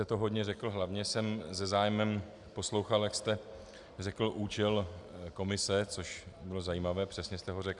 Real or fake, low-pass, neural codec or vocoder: real; 10.8 kHz; none